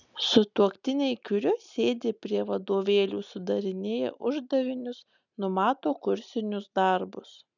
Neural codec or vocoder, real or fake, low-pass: none; real; 7.2 kHz